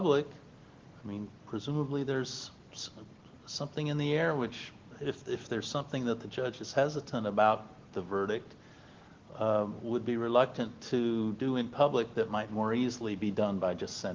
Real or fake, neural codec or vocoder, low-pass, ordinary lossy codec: real; none; 7.2 kHz; Opus, 16 kbps